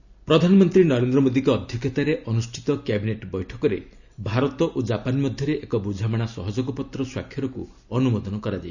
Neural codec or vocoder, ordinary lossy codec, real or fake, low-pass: none; none; real; 7.2 kHz